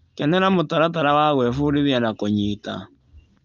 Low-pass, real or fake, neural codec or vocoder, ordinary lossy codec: 7.2 kHz; real; none; Opus, 32 kbps